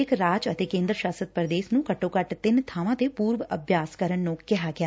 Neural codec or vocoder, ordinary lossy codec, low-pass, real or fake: none; none; none; real